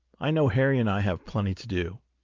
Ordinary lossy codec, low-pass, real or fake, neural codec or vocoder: Opus, 32 kbps; 7.2 kHz; real; none